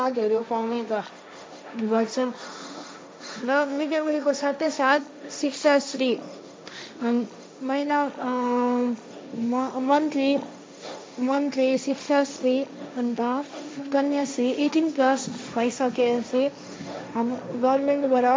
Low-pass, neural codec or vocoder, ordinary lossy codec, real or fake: none; codec, 16 kHz, 1.1 kbps, Voila-Tokenizer; none; fake